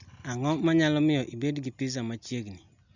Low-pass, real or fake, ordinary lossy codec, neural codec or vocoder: 7.2 kHz; real; none; none